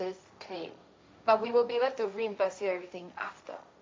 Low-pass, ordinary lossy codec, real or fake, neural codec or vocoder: none; none; fake; codec, 16 kHz, 1.1 kbps, Voila-Tokenizer